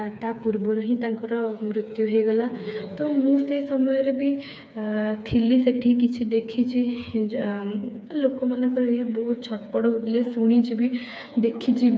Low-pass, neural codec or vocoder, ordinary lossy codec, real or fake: none; codec, 16 kHz, 4 kbps, FreqCodec, smaller model; none; fake